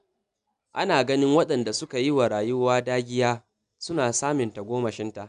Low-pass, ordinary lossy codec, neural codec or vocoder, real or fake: 10.8 kHz; none; none; real